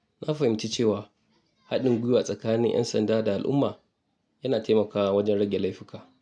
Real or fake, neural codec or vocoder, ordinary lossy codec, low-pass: real; none; none; none